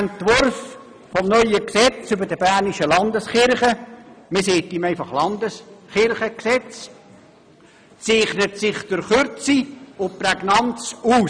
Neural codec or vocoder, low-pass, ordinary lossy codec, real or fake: none; 9.9 kHz; none; real